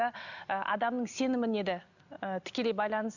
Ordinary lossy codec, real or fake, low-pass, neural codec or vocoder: none; real; 7.2 kHz; none